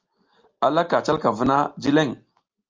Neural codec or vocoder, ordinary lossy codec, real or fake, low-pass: none; Opus, 32 kbps; real; 7.2 kHz